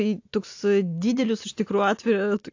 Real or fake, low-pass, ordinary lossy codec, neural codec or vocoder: real; 7.2 kHz; AAC, 48 kbps; none